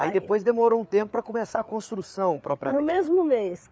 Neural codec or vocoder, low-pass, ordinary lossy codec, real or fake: codec, 16 kHz, 4 kbps, FreqCodec, larger model; none; none; fake